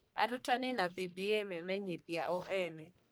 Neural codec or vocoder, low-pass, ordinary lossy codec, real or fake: codec, 44.1 kHz, 1.7 kbps, Pupu-Codec; none; none; fake